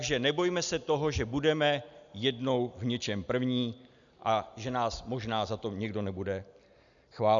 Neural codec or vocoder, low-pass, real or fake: none; 7.2 kHz; real